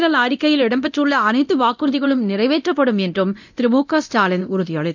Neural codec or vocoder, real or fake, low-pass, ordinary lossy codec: codec, 24 kHz, 0.9 kbps, DualCodec; fake; 7.2 kHz; none